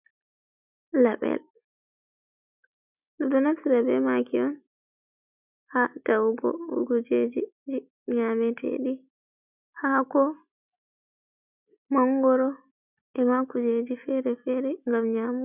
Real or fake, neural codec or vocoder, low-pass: real; none; 3.6 kHz